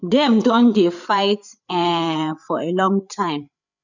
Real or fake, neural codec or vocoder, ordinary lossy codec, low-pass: fake; codec, 16 kHz, 8 kbps, FreqCodec, larger model; none; 7.2 kHz